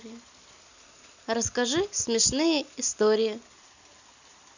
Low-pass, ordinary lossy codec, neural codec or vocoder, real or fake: 7.2 kHz; none; none; real